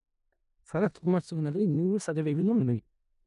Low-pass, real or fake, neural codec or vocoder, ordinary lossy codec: 10.8 kHz; fake; codec, 16 kHz in and 24 kHz out, 0.4 kbps, LongCat-Audio-Codec, four codebook decoder; none